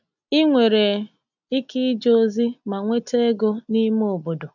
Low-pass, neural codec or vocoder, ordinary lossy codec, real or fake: 7.2 kHz; none; none; real